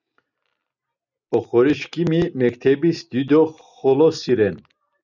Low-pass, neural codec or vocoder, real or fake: 7.2 kHz; none; real